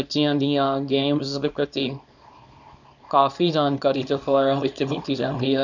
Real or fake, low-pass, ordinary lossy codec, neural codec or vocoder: fake; 7.2 kHz; none; codec, 24 kHz, 0.9 kbps, WavTokenizer, small release